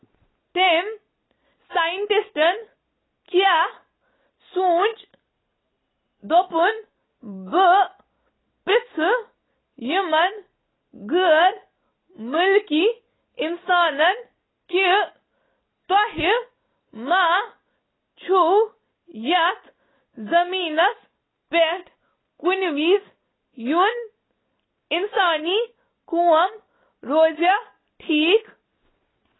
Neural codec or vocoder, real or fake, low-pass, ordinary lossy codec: none; real; 7.2 kHz; AAC, 16 kbps